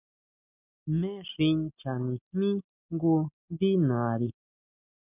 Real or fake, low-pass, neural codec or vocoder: real; 3.6 kHz; none